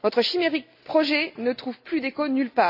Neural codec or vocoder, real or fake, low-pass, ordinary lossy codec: none; real; 5.4 kHz; none